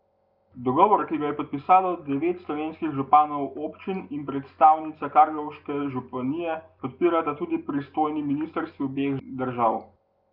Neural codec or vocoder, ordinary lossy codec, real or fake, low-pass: none; Opus, 32 kbps; real; 5.4 kHz